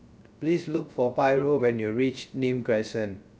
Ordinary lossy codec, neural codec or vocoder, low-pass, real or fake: none; codec, 16 kHz, 0.3 kbps, FocalCodec; none; fake